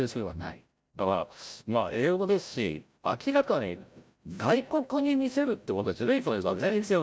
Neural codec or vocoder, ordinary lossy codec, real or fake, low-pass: codec, 16 kHz, 0.5 kbps, FreqCodec, larger model; none; fake; none